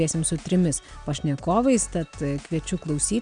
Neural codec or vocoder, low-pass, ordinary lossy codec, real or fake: none; 9.9 kHz; MP3, 96 kbps; real